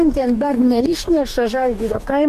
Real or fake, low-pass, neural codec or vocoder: fake; 14.4 kHz; codec, 44.1 kHz, 2.6 kbps, DAC